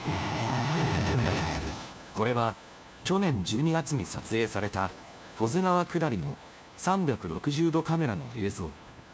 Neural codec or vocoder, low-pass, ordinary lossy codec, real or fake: codec, 16 kHz, 1 kbps, FunCodec, trained on LibriTTS, 50 frames a second; none; none; fake